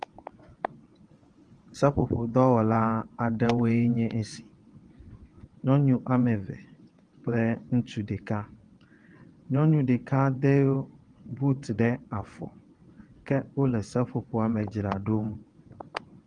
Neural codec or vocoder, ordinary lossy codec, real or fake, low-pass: vocoder, 22.05 kHz, 80 mel bands, WaveNeXt; Opus, 24 kbps; fake; 9.9 kHz